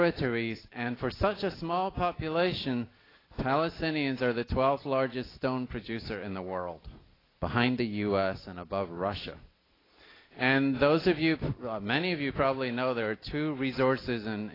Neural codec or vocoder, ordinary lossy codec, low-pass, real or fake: none; AAC, 24 kbps; 5.4 kHz; real